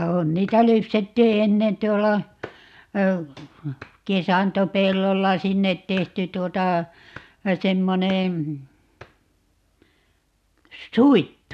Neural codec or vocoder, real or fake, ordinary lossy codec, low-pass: none; real; none; 14.4 kHz